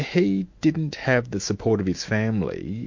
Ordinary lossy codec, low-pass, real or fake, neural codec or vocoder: MP3, 48 kbps; 7.2 kHz; fake; autoencoder, 48 kHz, 128 numbers a frame, DAC-VAE, trained on Japanese speech